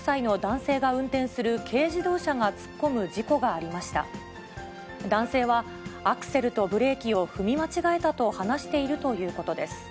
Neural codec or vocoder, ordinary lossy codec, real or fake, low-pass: none; none; real; none